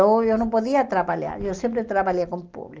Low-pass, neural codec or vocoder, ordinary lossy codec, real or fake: 7.2 kHz; none; Opus, 24 kbps; real